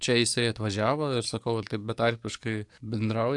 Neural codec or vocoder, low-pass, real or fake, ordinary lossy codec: codec, 44.1 kHz, 7.8 kbps, DAC; 10.8 kHz; fake; AAC, 64 kbps